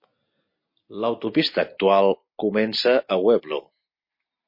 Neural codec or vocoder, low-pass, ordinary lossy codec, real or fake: none; 5.4 kHz; MP3, 32 kbps; real